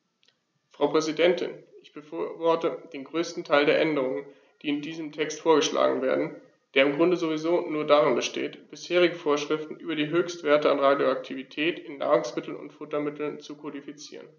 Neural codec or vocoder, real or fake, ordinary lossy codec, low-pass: none; real; none; none